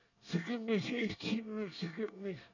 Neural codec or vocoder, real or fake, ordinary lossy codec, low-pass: codec, 24 kHz, 1 kbps, SNAC; fake; none; 7.2 kHz